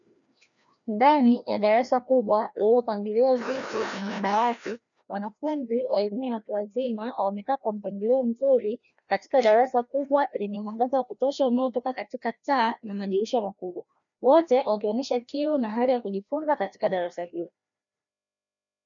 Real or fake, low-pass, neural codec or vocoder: fake; 7.2 kHz; codec, 16 kHz, 1 kbps, FreqCodec, larger model